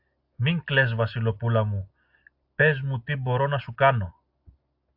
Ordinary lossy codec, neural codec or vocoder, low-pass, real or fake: AAC, 48 kbps; none; 5.4 kHz; real